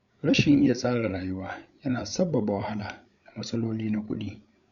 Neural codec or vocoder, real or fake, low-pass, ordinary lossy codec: codec, 16 kHz, 8 kbps, FreqCodec, larger model; fake; 7.2 kHz; none